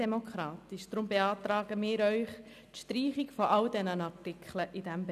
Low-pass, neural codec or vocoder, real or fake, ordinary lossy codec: 14.4 kHz; none; real; none